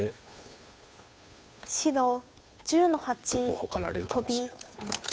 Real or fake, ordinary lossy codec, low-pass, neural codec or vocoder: fake; none; none; codec, 16 kHz, 2 kbps, FunCodec, trained on Chinese and English, 25 frames a second